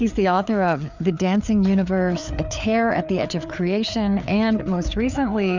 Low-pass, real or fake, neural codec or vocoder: 7.2 kHz; fake; codec, 16 kHz, 4 kbps, FreqCodec, larger model